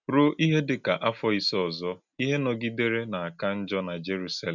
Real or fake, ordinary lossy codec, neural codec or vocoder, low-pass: real; none; none; 7.2 kHz